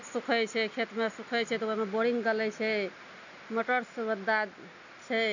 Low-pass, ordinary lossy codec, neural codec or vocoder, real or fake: 7.2 kHz; none; none; real